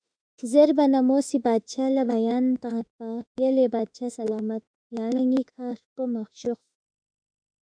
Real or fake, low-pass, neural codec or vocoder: fake; 9.9 kHz; autoencoder, 48 kHz, 32 numbers a frame, DAC-VAE, trained on Japanese speech